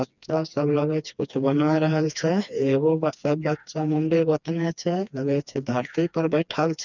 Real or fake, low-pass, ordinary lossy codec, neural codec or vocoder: fake; 7.2 kHz; none; codec, 16 kHz, 2 kbps, FreqCodec, smaller model